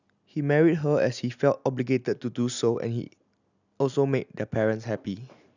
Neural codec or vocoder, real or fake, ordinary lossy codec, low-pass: none; real; none; 7.2 kHz